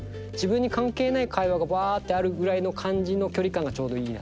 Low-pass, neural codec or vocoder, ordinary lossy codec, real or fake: none; none; none; real